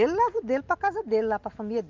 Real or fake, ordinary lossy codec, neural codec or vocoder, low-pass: real; Opus, 32 kbps; none; 7.2 kHz